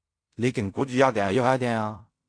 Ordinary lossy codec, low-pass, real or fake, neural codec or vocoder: AAC, 48 kbps; 9.9 kHz; fake; codec, 16 kHz in and 24 kHz out, 0.4 kbps, LongCat-Audio-Codec, fine tuned four codebook decoder